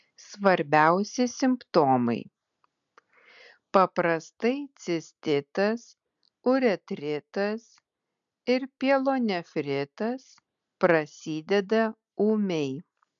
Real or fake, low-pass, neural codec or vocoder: real; 7.2 kHz; none